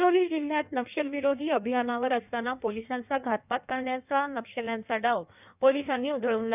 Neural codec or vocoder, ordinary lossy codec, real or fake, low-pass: codec, 16 kHz in and 24 kHz out, 1.1 kbps, FireRedTTS-2 codec; none; fake; 3.6 kHz